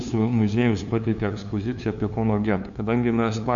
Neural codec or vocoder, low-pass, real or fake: codec, 16 kHz, 2 kbps, FunCodec, trained on LibriTTS, 25 frames a second; 7.2 kHz; fake